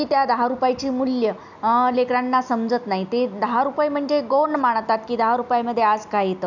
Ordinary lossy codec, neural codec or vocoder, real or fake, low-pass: none; none; real; 7.2 kHz